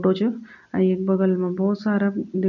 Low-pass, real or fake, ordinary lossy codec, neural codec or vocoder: 7.2 kHz; real; none; none